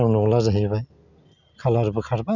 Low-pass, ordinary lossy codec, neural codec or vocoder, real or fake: 7.2 kHz; none; none; real